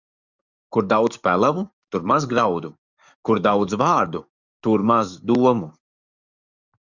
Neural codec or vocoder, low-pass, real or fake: codec, 44.1 kHz, 7.8 kbps, DAC; 7.2 kHz; fake